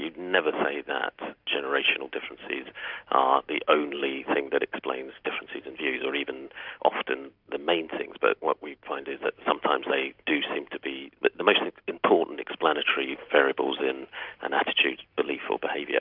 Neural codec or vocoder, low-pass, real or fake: none; 5.4 kHz; real